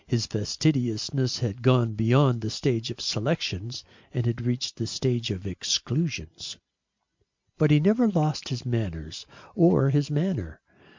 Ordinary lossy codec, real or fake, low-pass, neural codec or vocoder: MP3, 64 kbps; real; 7.2 kHz; none